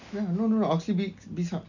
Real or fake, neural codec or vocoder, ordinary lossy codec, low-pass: real; none; none; 7.2 kHz